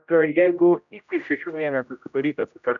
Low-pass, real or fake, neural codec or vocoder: 7.2 kHz; fake; codec, 16 kHz, 0.5 kbps, X-Codec, HuBERT features, trained on general audio